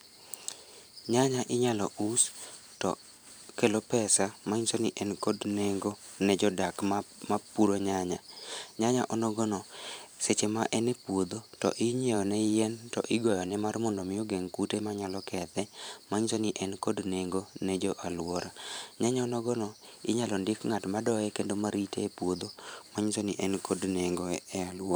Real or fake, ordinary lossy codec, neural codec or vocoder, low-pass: fake; none; vocoder, 44.1 kHz, 128 mel bands every 512 samples, BigVGAN v2; none